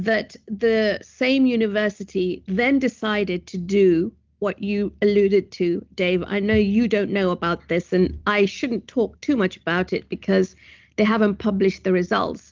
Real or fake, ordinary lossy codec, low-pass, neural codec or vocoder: real; Opus, 32 kbps; 7.2 kHz; none